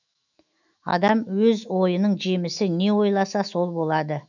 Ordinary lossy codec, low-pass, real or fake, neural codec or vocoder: none; 7.2 kHz; fake; autoencoder, 48 kHz, 128 numbers a frame, DAC-VAE, trained on Japanese speech